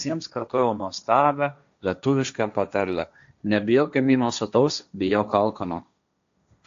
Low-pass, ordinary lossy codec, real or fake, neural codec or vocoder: 7.2 kHz; AAC, 64 kbps; fake; codec, 16 kHz, 1.1 kbps, Voila-Tokenizer